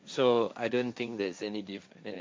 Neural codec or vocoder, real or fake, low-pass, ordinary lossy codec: codec, 16 kHz, 1.1 kbps, Voila-Tokenizer; fake; none; none